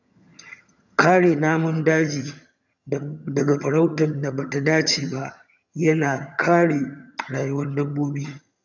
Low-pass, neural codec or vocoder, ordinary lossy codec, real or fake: 7.2 kHz; vocoder, 22.05 kHz, 80 mel bands, HiFi-GAN; none; fake